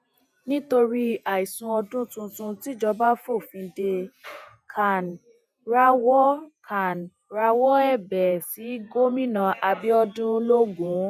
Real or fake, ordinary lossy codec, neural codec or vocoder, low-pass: fake; none; vocoder, 48 kHz, 128 mel bands, Vocos; 14.4 kHz